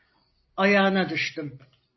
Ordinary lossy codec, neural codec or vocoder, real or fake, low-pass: MP3, 24 kbps; none; real; 7.2 kHz